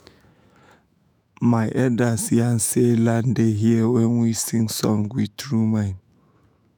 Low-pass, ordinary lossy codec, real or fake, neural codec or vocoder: none; none; fake; autoencoder, 48 kHz, 128 numbers a frame, DAC-VAE, trained on Japanese speech